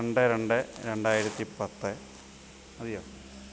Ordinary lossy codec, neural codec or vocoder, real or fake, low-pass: none; none; real; none